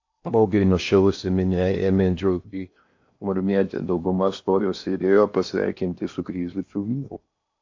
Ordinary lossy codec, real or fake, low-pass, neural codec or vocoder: AAC, 48 kbps; fake; 7.2 kHz; codec, 16 kHz in and 24 kHz out, 0.8 kbps, FocalCodec, streaming, 65536 codes